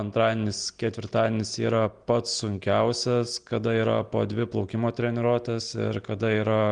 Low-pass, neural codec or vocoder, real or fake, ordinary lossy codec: 9.9 kHz; none; real; Opus, 24 kbps